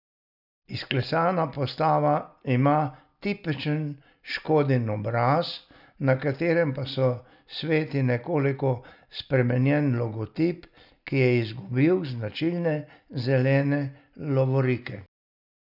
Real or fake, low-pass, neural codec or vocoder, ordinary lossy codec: real; 5.4 kHz; none; none